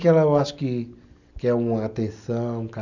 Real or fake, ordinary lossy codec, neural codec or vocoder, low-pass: real; none; none; 7.2 kHz